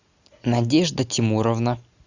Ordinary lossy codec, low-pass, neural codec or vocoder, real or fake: Opus, 64 kbps; 7.2 kHz; none; real